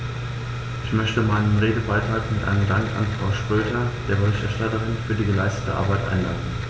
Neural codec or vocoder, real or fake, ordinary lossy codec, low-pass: none; real; none; none